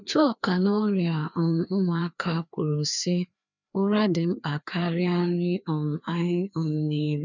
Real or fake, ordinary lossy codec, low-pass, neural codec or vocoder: fake; none; 7.2 kHz; codec, 16 kHz, 2 kbps, FreqCodec, larger model